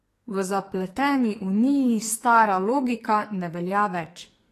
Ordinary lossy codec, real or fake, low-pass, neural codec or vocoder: AAC, 48 kbps; fake; 14.4 kHz; codec, 44.1 kHz, 2.6 kbps, SNAC